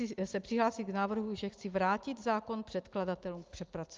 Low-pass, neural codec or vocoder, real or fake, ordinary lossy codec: 7.2 kHz; none; real; Opus, 24 kbps